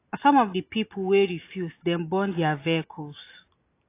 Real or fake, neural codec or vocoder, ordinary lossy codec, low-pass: real; none; AAC, 24 kbps; 3.6 kHz